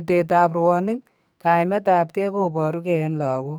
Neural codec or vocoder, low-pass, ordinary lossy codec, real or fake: codec, 44.1 kHz, 2.6 kbps, SNAC; none; none; fake